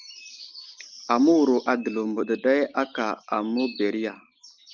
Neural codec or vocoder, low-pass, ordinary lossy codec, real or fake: none; 7.2 kHz; Opus, 32 kbps; real